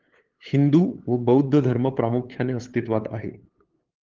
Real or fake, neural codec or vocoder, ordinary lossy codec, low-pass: fake; codec, 16 kHz, 8 kbps, FunCodec, trained on LibriTTS, 25 frames a second; Opus, 16 kbps; 7.2 kHz